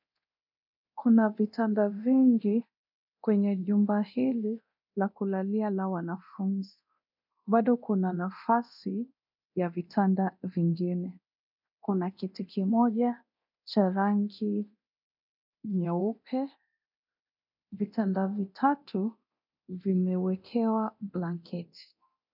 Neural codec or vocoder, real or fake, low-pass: codec, 24 kHz, 0.9 kbps, DualCodec; fake; 5.4 kHz